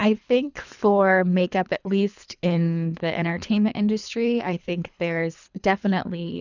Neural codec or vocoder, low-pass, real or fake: codec, 24 kHz, 3 kbps, HILCodec; 7.2 kHz; fake